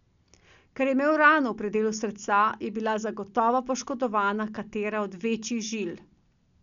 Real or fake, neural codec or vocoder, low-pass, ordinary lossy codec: real; none; 7.2 kHz; none